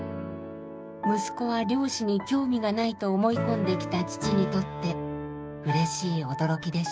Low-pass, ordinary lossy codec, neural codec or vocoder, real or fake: none; none; codec, 16 kHz, 6 kbps, DAC; fake